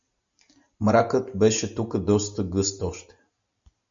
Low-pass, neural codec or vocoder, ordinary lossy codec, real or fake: 7.2 kHz; none; MP3, 64 kbps; real